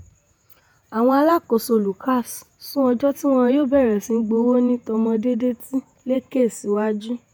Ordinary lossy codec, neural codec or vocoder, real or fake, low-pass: none; vocoder, 48 kHz, 128 mel bands, Vocos; fake; none